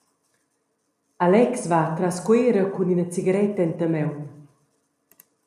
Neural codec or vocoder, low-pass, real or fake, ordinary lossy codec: none; 14.4 kHz; real; AAC, 96 kbps